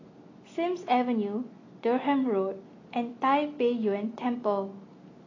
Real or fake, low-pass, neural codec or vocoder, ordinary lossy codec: real; 7.2 kHz; none; AAC, 32 kbps